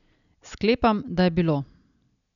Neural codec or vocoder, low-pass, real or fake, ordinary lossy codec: none; 7.2 kHz; real; none